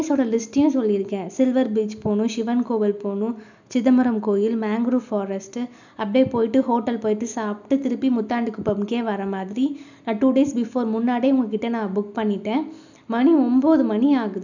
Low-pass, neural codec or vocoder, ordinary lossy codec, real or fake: 7.2 kHz; none; none; real